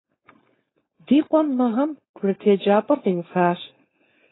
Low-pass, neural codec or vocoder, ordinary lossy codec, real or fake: 7.2 kHz; codec, 16 kHz, 4.8 kbps, FACodec; AAC, 16 kbps; fake